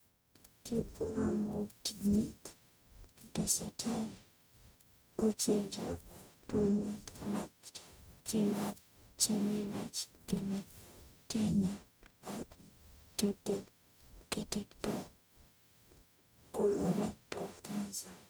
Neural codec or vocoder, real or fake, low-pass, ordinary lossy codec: codec, 44.1 kHz, 0.9 kbps, DAC; fake; none; none